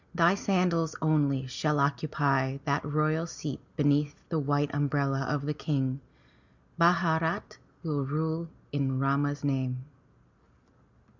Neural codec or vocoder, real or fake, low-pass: none; real; 7.2 kHz